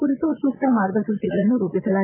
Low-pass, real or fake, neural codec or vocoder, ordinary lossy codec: 3.6 kHz; fake; vocoder, 22.05 kHz, 80 mel bands, Vocos; MP3, 24 kbps